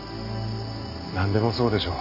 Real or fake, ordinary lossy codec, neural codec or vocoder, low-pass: real; none; none; 5.4 kHz